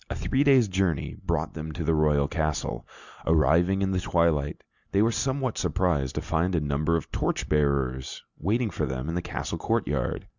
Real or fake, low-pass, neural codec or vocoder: real; 7.2 kHz; none